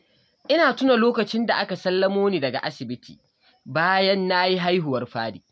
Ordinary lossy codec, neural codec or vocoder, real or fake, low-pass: none; none; real; none